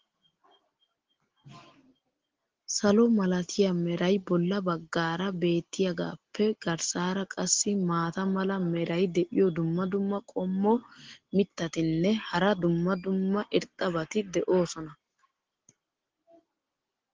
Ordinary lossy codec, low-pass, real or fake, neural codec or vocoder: Opus, 16 kbps; 7.2 kHz; real; none